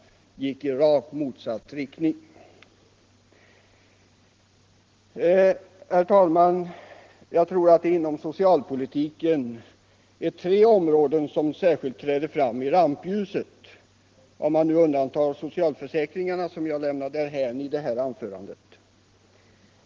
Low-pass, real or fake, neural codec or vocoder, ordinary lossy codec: 7.2 kHz; real; none; Opus, 16 kbps